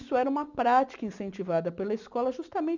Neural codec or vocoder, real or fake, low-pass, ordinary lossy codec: none; real; 7.2 kHz; none